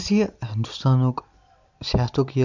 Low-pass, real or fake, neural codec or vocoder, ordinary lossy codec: 7.2 kHz; real; none; none